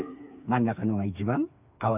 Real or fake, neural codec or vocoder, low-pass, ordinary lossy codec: fake; codec, 16 kHz, 4 kbps, FreqCodec, smaller model; 3.6 kHz; none